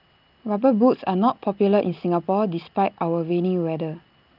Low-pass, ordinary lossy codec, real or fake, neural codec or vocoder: 5.4 kHz; Opus, 24 kbps; real; none